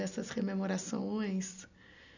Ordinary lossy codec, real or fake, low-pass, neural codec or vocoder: none; real; 7.2 kHz; none